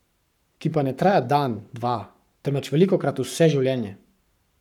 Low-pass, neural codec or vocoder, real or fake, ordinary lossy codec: 19.8 kHz; codec, 44.1 kHz, 7.8 kbps, Pupu-Codec; fake; none